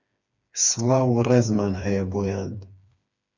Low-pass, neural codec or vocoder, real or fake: 7.2 kHz; codec, 16 kHz, 4 kbps, FreqCodec, smaller model; fake